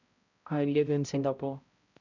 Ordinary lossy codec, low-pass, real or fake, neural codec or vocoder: none; 7.2 kHz; fake; codec, 16 kHz, 0.5 kbps, X-Codec, HuBERT features, trained on balanced general audio